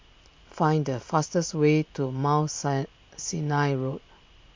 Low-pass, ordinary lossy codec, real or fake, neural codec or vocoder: 7.2 kHz; MP3, 48 kbps; real; none